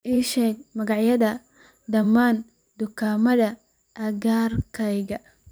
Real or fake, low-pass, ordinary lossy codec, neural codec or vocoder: fake; none; none; vocoder, 44.1 kHz, 128 mel bands every 512 samples, BigVGAN v2